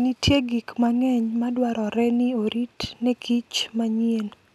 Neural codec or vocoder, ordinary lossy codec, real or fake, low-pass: none; none; real; 14.4 kHz